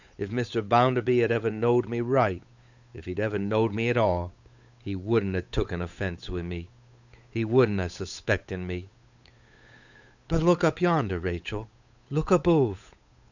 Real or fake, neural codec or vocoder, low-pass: fake; codec, 16 kHz, 8 kbps, FunCodec, trained on Chinese and English, 25 frames a second; 7.2 kHz